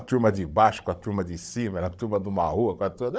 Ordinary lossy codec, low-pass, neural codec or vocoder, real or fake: none; none; codec, 16 kHz, 16 kbps, FunCodec, trained on Chinese and English, 50 frames a second; fake